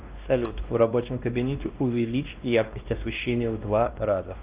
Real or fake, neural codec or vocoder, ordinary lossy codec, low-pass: fake; codec, 16 kHz, 1 kbps, X-Codec, WavLM features, trained on Multilingual LibriSpeech; Opus, 24 kbps; 3.6 kHz